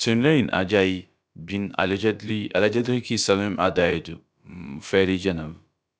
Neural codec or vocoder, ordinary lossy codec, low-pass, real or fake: codec, 16 kHz, about 1 kbps, DyCAST, with the encoder's durations; none; none; fake